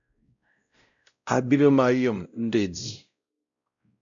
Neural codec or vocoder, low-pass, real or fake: codec, 16 kHz, 0.5 kbps, X-Codec, WavLM features, trained on Multilingual LibriSpeech; 7.2 kHz; fake